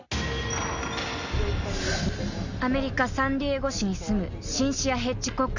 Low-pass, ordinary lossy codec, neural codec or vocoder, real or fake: 7.2 kHz; none; none; real